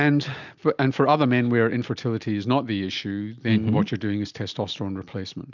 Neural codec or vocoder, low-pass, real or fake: none; 7.2 kHz; real